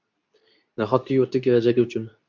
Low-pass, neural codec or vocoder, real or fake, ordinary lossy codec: 7.2 kHz; codec, 24 kHz, 0.9 kbps, WavTokenizer, medium speech release version 2; fake; MP3, 64 kbps